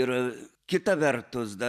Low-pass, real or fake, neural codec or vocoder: 14.4 kHz; real; none